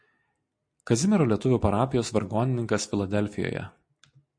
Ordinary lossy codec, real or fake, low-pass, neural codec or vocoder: MP3, 48 kbps; real; 9.9 kHz; none